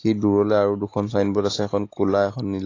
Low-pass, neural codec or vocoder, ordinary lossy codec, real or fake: 7.2 kHz; none; AAC, 32 kbps; real